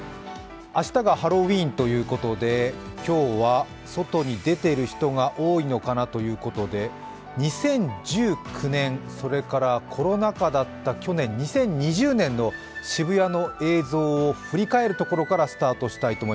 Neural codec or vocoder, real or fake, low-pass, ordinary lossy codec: none; real; none; none